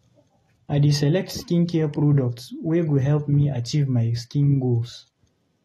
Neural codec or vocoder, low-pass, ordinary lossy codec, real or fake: none; 19.8 kHz; AAC, 32 kbps; real